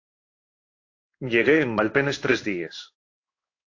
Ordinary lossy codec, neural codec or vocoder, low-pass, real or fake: AAC, 48 kbps; codec, 16 kHz in and 24 kHz out, 1 kbps, XY-Tokenizer; 7.2 kHz; fake